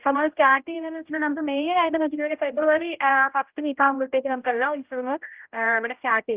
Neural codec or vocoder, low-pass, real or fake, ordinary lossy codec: codec, 16 kHz, 0.5 kbps, X-Codec, HuBERT features, trained on general audio; 3.6 kHz; fake; Opus, 32 kbps